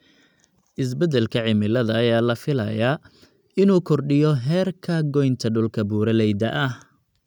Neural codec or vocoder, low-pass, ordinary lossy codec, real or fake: none; 19.8 kHz; none; real